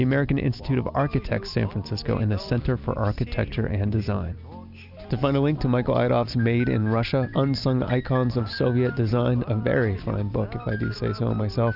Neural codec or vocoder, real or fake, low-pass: none; real; 5.4 kHz